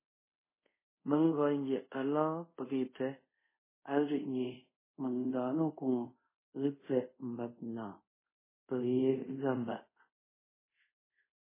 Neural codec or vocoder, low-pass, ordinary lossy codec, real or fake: codec, 24 kHz, 0.5 kbps, DualCodec; 3.6 kHz; MP3, 16 kbps; fake